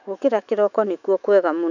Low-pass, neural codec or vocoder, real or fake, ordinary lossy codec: 7.2 kHz; autoencoder, 48 kHz, 128 numbers a frame, DAC-VAE, trained on Japanese speech; fake; none